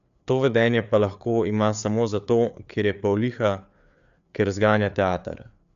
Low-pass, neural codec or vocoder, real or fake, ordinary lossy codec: 7.2 kHz; codec, 16 kHz, 4 kbps, FreqCodec, larger model; fake; none